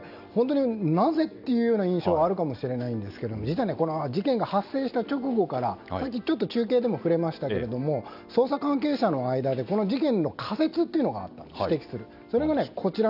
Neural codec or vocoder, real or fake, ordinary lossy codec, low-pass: none; real; none; 5.4 kHz